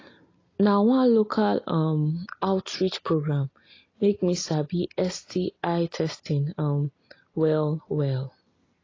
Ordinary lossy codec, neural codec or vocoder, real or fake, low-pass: AAC, 32 kbps; none; real; 7.2 kHz